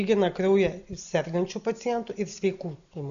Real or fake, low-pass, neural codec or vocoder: real; 7.2 kHz; none